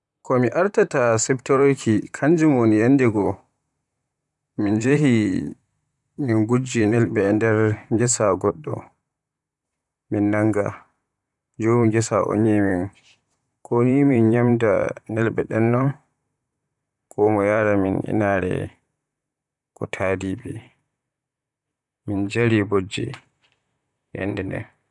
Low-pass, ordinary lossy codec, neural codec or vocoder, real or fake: 10.8 kHz; none; vocoder, 44.1 kHz, 128 mel bands, Pupu-Vocoder; fake